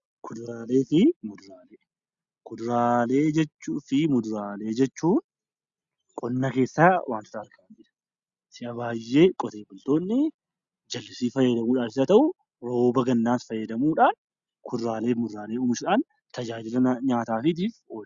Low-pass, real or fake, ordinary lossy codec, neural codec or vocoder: 7.2 kHz; real; Opus, 64 kbps; none